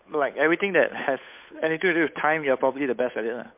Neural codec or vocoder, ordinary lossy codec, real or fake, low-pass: codec, 16 kHz, 8 kbps, FunCodec, trained on Chinese and English, 25 frames a second; MP3, 32 kbps; fake; 3.6 kHz